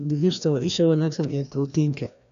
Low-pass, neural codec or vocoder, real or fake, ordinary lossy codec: 7.2 kHz; codec, 16 kHz, 1 kbps, FreqCodec, larger model; fake; none